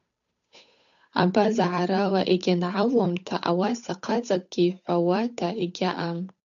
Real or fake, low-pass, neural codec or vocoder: fake; 7.2 kHz; codec, 16 kHz, 8 kbps, FunCodec, trained on Chinese and English, 25 frames a second